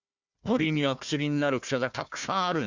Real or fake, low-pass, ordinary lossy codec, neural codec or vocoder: fake; 7.2 kHz; Opus, 64 kbps; codec, 16 kHz, 1 kbps, FunCodec, trained on Chinese and English, 50 frames a second